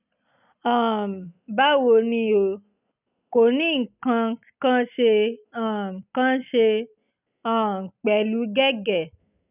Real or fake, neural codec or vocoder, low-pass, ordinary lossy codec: real; none; 3.6 kHz; none